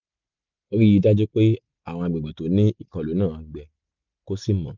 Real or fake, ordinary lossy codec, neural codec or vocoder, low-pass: real; none; none; 7.2 kHz